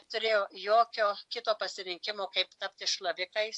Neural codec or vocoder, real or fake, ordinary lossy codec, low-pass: vocoder, 24 kHz, 100 mel bands, Vocos; fake; AAC, 64 kbps; 10.8 kHz